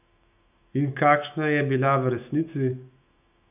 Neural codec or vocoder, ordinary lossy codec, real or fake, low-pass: autoencoder, 48 kHz, 128 numbers a frame, DAC-VAE, trained on Japanese speech; none; fake; 3.6 kHz